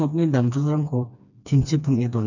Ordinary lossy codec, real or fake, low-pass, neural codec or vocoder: none; fake; 7.2 kHz; codec, 16 kHz, 2 kbps, FreqCodec, smaller model